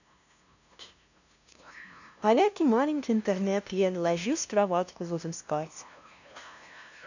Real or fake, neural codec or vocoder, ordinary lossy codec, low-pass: fake; codec, 16 kHz, 0.5 kbps, FunCodec, trained on LibriTTS, 25 frames a second; none; 7.2 kHz